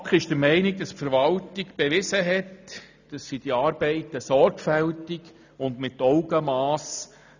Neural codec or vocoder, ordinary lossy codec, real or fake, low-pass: none; none; real; 7.2 kHz